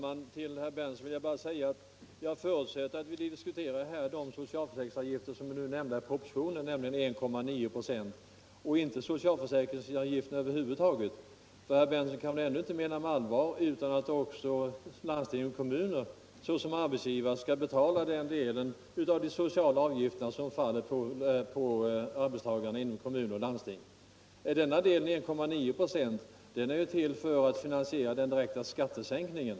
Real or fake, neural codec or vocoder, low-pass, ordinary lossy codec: real; none; none; none